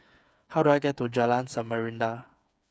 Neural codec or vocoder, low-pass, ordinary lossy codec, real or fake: codec, 16 kHz, 8 kbps, FreqCodec, smaller model; none; none; fake